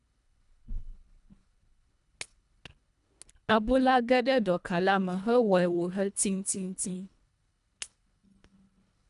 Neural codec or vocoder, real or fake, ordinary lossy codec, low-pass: codec, 24 kHz, 1.5 kbps, HILCodec; fake; none; 10.8 kHz